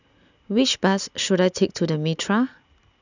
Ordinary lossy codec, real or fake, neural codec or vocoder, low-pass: none; real; none; 7.2 kHz